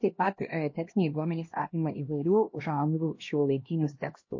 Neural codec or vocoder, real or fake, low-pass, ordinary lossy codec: codec, 16 kHz, 1 kbps, X-Codec, HuBERT features, trained on LibriSpeech; fake; 7.2 kHz; MP3, 32 kbps